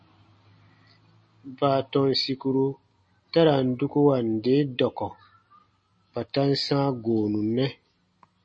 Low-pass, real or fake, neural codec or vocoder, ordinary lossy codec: 9.9 kHz; real; none; MP3, 32 kbps